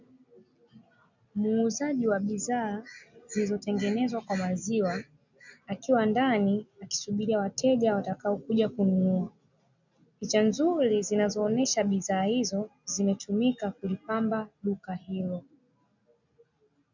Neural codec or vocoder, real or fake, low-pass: none; real; 7.2 kHz